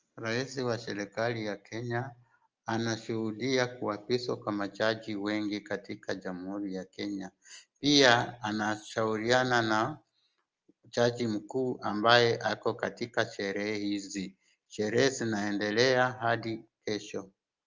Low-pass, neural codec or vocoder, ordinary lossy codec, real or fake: 7.2 kHz; none; Opus, 24 kbps; real